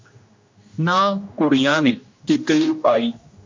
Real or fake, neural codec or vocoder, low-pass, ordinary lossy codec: fake; codec, 16 kHz, 1 kbps, X-Codec, HuBERT features, trained on general audio; 7.2 kHz; MP3, 48 kbps